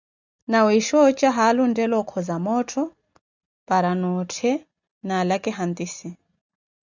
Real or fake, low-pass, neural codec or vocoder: real; 7.2 kHz; none